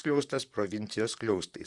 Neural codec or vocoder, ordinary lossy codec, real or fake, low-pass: vocoder, 44.1 kHz, 128 mel bands, Pupu-Vocoder; Opus, 64 kbps; fake; 10.8 kHz